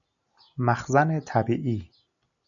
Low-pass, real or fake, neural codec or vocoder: 7.2 kHz; real; none